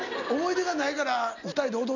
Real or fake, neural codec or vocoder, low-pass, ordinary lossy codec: real; none; 7.2 kHz; none